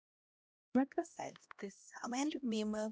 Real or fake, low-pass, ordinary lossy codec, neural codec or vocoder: fake; none; none; codec, 16 kHz, 1 kbps, X-Codec, HuBERT features, trained on LibriSpeech